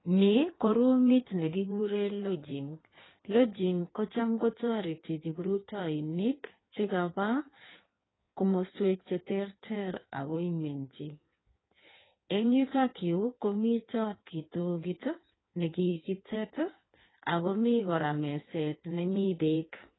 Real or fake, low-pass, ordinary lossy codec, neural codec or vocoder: fake; 7.2 kHz; AAC, 16 kbps; codec, 16 kHz in and 24 kHz out, 1.1 kbps, FireRedTTS-2 codec